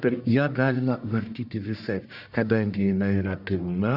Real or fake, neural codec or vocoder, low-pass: fake; codec, 44.1 kHz, 1.7 kbps, Pupu-Codec; 5.4 kHz